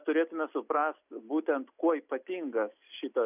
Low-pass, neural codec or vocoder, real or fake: 3.6 kHz; none; real